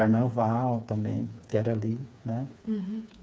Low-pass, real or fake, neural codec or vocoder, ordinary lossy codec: none; fake; codec, 16 kHz, 4 kbps, FreqCodec, smaller model; none